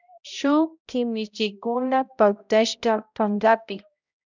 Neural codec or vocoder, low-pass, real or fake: codec, 16 kHz, 0.5 kbps, X-Codec, HuBERT features, trained on balanced general audio; 7.2 kHz; fake